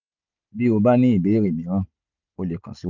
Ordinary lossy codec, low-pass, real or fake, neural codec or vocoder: none; 7.2 kHz; real; none